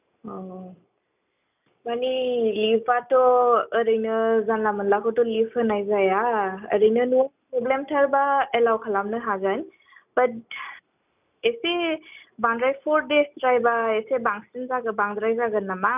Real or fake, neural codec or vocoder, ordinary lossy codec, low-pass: real; none; none; 3.6 kHz